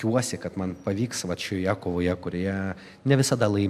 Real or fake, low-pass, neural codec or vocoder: real; 14.4 kHz; none